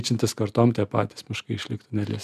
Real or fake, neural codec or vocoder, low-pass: real; none; 14.4 kHz